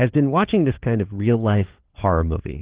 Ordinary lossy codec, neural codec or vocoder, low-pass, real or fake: Opus, 16 kbps; codec, 16 kHz, 4 kbps, FunCodec, trained on LibriTTS, 50 frames a second; 3.6 kHz; fake